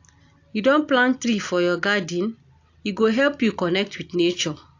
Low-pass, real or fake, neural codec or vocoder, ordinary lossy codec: 7.2 kHz; real; none; AAC, 48 kbps